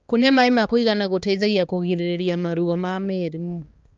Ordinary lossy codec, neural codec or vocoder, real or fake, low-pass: Opus, 24 kbps; codec, 16 kHz, 2 kbps, X-Codec, HuBERT features, trained on balanced general audio; fake; 7.2 kHz